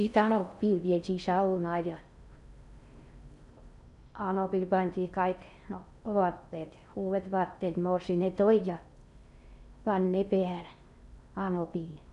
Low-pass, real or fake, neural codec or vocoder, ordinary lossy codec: 10.8 kHz; fake; codec, 16 kHz in and 24 kHz out, 0.6 kbps, FocalCodec, streaming, 4096 codes; MP3, 96 kbps